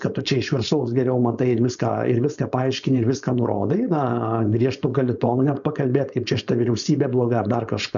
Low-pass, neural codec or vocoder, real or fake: 7.2 kHz; codec, 16 kHz, 4.8 kbps, FACodec; fake